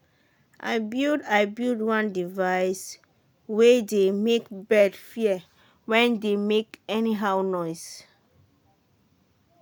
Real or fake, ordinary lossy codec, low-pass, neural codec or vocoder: real; none; none; none